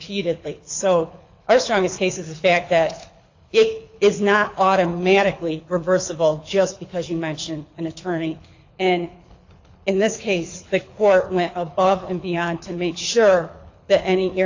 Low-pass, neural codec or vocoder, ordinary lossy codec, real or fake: 7.2 kHz; codec, 24 kHz, 6 kbps, HILCodec; AAC, 48 kbps; fake